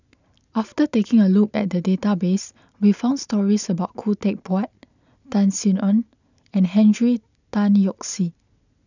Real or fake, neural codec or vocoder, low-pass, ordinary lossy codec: real; none; 7.2 kHz; none